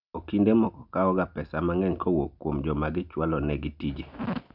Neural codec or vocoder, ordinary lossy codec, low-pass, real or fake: none; none; 5.4 kHz; real